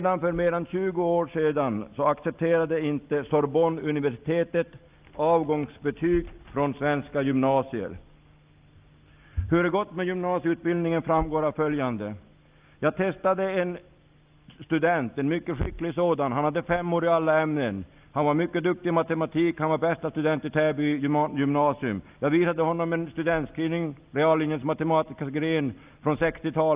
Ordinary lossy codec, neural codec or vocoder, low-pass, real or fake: Opus, 24 kbps; none; 3.6 kHz; real